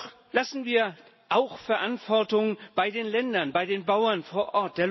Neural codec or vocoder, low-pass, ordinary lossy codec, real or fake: none; 7.2 kHz; MP3, 24 kbps; real